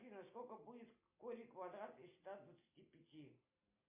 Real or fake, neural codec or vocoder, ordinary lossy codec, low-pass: real; none; MP3, 24 kbps; 3.6 kHz